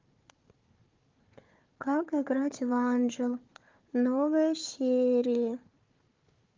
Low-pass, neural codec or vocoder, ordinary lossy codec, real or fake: 7.2 kHz; codec, 16 kHz, 4 kbps, FunCodec, trained on Chinese and English, 50 frames a second; Opus, 32 kbps; fake